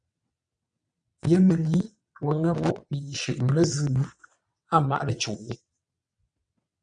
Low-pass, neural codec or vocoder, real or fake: 9.9 kHz; vocoder, 22.05 kHz, 80 mel bands, WaveNeXt; fake